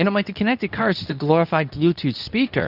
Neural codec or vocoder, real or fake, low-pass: codec, 24 kHz, 0.9 kbps, WavTokenizer, medium speech release version 2; fake; 5.4 kHz